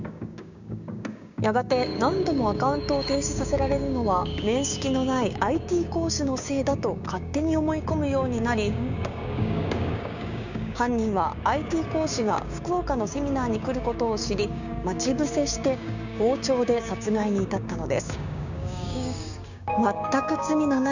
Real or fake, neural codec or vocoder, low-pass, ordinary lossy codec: fake; codec, 16 kHz, 6 kbps, DAC; 7.2 kHz; none